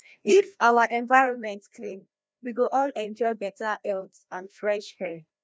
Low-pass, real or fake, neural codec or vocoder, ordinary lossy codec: none; fake; codec, 16 kHz, 1 kbps, FreqCodec, larger model; none